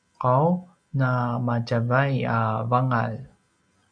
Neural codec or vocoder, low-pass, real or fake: none; 9.9 kHz; real